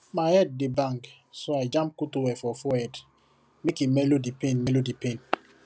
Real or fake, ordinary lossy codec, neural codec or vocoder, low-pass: real; none; none; none